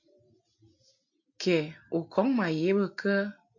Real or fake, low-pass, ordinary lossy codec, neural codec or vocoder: real; 7.2 kHz; MP3, 48 kbps; none